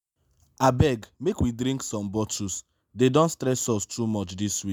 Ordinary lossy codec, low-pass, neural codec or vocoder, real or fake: none; none; none; real